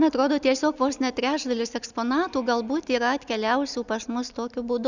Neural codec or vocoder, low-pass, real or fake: none; 7.2 kHz; real